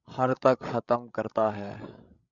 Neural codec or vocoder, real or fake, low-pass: codec, 16 kHz, 16 kbps, FreqCodec, larger model; fake; 7.2 kHz